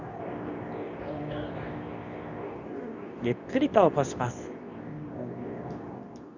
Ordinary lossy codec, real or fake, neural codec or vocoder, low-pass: none; fake; codec, 24 kHz, 0.9 kbps, WavTokenizer, medium speech release version 2; 7.2 kHz